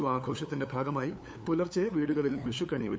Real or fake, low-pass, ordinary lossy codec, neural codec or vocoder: fake; none; none; codec, 16 kHz, 8 kbps, FunCodec, trained on LibriTTS, 25 frames a second